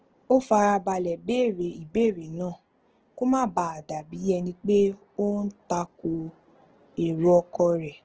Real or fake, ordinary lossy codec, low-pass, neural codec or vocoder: real; Opus, 16 kbps; 7.2 kHz; none